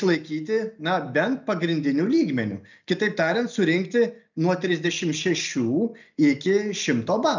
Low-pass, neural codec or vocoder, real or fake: 7.2 kHz; none; real